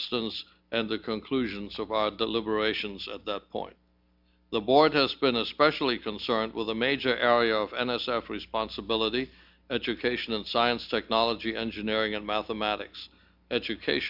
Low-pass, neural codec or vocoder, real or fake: 5.4 kHz; none; real